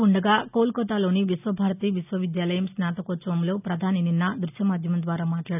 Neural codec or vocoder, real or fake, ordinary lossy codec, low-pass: none; real; none; 3.6 kHz